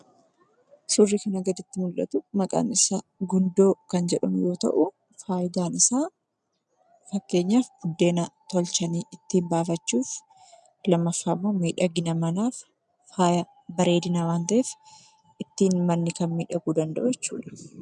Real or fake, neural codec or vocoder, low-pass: real; none; 10.8 kHz